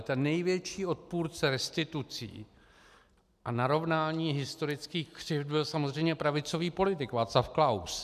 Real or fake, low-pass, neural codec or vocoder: real; 14.4 kHz; none